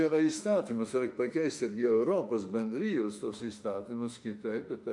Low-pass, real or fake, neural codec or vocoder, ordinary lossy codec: 10.8 kHz; fake; autoencoder, 48 kHz, 32 numbers a frame, DAC-VAE, trained on Japanese speech; MP3, 64 kbps